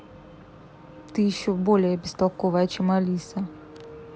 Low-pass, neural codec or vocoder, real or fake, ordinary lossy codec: none; none; real; none